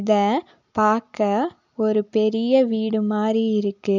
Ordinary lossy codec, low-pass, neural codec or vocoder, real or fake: none; 7.2 kHz; none; real